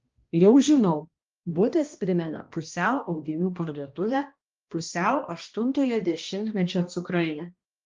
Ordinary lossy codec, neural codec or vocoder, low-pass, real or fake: Opus, 32 kbps; codec, 16 kHz, 1 kbps, X-Codec, HuBERT features, trained on balanced general audio; 7.2 kHz; fake